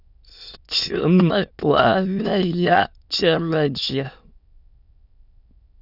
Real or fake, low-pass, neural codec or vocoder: fake; 5.4 kHz; autoencoder, 22.05 kHz, a latent of 192 numbers a frame, VITS, trained on many speakers